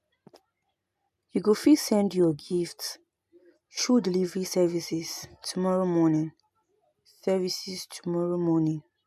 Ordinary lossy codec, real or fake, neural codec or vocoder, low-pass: none; real; none; 14.4 kHz